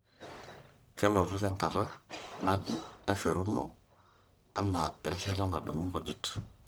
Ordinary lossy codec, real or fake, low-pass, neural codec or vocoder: none; fake; none; codec, 44.1 kHz, 1.7 kbps, Pupu-Codec